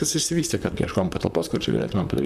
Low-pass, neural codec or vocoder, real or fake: 14.4 kHz; vocoder, 44.1 kHz, 128 mel bands, Pupu-Vocoder; fake